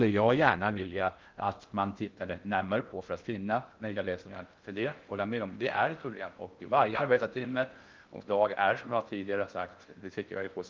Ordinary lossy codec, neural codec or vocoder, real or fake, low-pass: Opus, 32 kbps; codec, 16 kHz in and 24 kHz out, 0.6 kbps, FocalCodec, streaming, 2048 codes; fake; 7.2 kHz